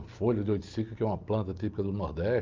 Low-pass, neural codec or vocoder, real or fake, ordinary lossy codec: 7.2 kHz; none; real; Opus, 32 kbps